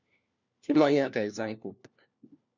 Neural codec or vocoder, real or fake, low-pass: codec, 16 kHz, 1 kbps, FunCodec, trained on LibriTTS, 50 frames a second; fake; 7.2 kHz